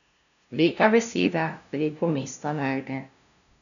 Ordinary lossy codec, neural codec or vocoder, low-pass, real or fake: none; codec, 16 kHz, 0.5 kbps, FunCodec, trained on LibriTTS, 25 frames a second; 7.2 kHz; fake